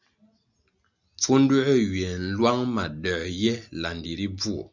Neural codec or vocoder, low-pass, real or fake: none; 7.2 kHz; real